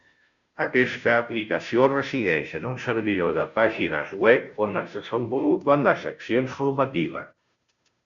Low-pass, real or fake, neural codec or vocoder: 7.2 kHz; fake; codec, 16 kHz, 0.5 kbps, FunCodec, trained on Chinese and English, 25 frames a second